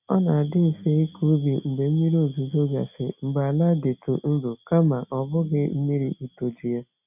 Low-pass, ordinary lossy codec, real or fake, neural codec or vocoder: 3.6 kHz; none; real; none